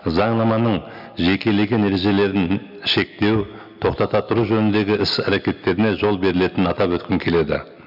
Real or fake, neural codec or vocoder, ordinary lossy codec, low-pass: real; none; none; 5.4 kHz